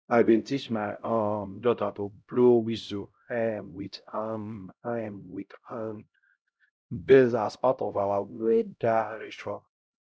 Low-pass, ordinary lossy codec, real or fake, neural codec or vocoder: none; none; fake; codec, 16 kHz, 0.5 kbps, X-Codec, HuBERT features, trained on LibriSpeech